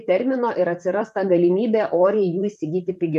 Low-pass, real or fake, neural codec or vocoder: 14.4 kHz; fake; vocoder, 44.1 kHz, 128 mel bands, Pupu-Vocoder